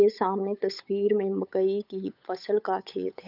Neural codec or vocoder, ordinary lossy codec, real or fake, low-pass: codec, 16 kHz, 8 kbps, FunCodec, trained on Chinese and English, 25 frames a second; none; fake; 5.4 kHz